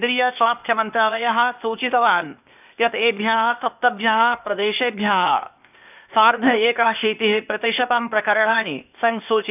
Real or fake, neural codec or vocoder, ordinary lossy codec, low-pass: fake; codec, 16 kHz, 0.8 kbps, ZipCodec; none; 3.6 kHz